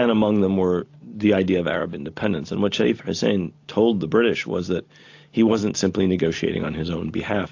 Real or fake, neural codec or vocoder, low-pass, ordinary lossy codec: real; none; 7.2 kHz; AAC, 48 kbps